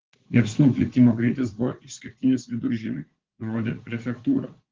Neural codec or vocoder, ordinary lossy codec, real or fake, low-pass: vocoder, 44.1 kHz, 80 mel bands, Vocos; Opus, 16 kbps; fake; 7.2 kHz